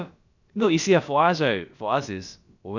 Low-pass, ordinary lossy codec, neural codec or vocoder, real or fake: 7.2 kHz; none; codec, 16 kHz, about 1 kbps, DyCAST, with the encoder's durations; fake